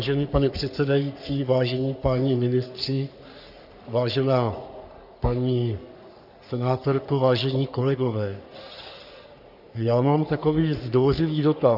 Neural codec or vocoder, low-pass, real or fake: codec, 44.1 kHz, 3.4 kbps, Pupu-Codec; 5.4 kHz; fake